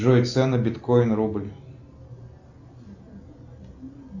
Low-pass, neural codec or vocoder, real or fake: 7.2 kHz; none; real